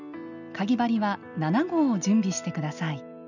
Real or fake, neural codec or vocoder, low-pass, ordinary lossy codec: real; none; 7.2 kHz; none